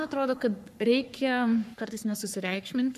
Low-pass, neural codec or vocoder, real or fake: 14.4 kHz; codec, 44.1 kHz, 3.4 kbps, Pupu-Codec; fake